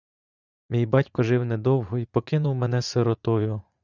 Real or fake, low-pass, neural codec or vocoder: fake; 7.2 kHz; vocoder, 44.1 kHz, 80 mel bands, Vocos